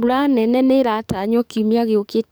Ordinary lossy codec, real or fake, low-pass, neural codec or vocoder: none; fake; none; codec, 44.1 kHz, 7.8 kbps, DAC